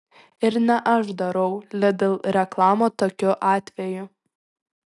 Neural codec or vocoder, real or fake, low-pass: none; real; 10.8 kHz